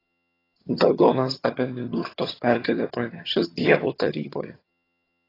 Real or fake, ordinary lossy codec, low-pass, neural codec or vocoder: fake; AAC, 24 kbps; 5.4 kHz; vocoder, 22.05 kHz, 80 mel bands, HiFi-GAN